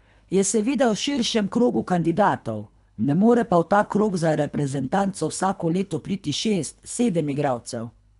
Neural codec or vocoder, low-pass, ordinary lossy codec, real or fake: codec, 24 kHz, 3 kbps, HILCodec; 10.8 kHz; none; fake